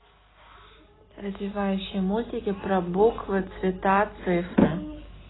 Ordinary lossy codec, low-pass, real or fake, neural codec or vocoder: AAC, 16 kbps; 7.2 kHz; real; none